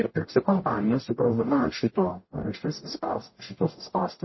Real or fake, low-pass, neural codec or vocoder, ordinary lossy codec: fake; 7.2 kHz; codec, 44.1 kHz, 0.9 kbps, DAC; MP3, 24 kbps